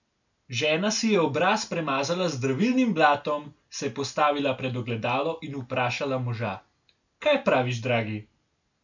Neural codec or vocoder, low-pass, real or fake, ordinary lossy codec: none; 7.2 kHz; real; none